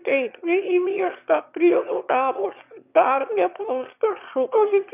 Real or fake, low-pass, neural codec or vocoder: fake; 3.6 kHz; autoencoder, 22.05 kHz, a latent of 192 numbers a frame, VITS, trained on one speaker